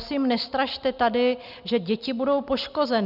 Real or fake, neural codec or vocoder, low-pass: real; none; 5.4 kHz